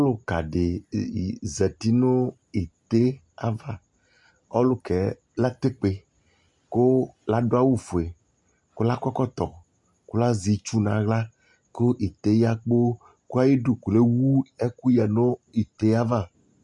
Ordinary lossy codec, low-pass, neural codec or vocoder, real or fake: MP3, 64 kbps; 10.8 kHz; none; real